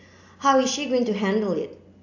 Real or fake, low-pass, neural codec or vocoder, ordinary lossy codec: real; 7.2 kHz; none; AAC, 48 kbps